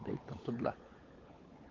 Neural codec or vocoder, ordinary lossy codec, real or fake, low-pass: codec, 16 kHz, 16 kbps, FunCodec, trained on LibriTTS, 50 frames a second; Opus, 32 kbps; fake; 7.2 kHz